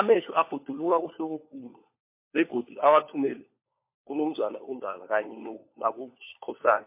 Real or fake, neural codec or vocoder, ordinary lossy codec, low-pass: fake; codec, 16 kHz, 4 kbps, FunCodec, trained on LibriTTS, 50 frames a second; MP3, 24 kbps; 3.6 kHz